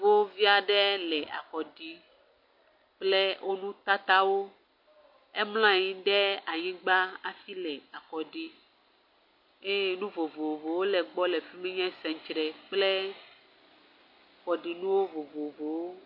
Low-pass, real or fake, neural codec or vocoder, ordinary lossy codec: 5.4 kHz; real; none; MP3, 32 kbps